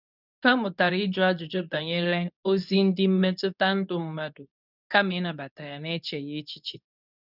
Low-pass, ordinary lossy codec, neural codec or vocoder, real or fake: 5.4 kHz; none; codec, 24 kHz, 0.9 kbps, WavTokenizer, medium speech release version 2; fake